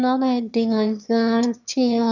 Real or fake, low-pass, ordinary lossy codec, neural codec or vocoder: fake; 7.2 kHz; none; autoencoder, 22.05 kHz, a latent of 192 numbers a frame, VITS, trained on one speaker